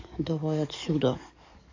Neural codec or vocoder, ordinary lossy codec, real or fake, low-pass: codec, 44.1 kHz, 7.8 kbps, DAC; AAC, 48 kbps; fake; 7.2 kHz